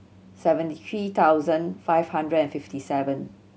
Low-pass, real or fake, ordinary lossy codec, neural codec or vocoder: none; real; none; none